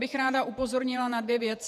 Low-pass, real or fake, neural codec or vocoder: 14.4 kHz; fake; vocoder, 48 kHz, 128 mel bands, Vocos